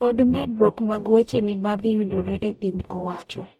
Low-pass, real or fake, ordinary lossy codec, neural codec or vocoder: 19.8 kHz; fake; MP3, 64 kbps; codec, 44.1 kHz, 0.9 kbps, DAC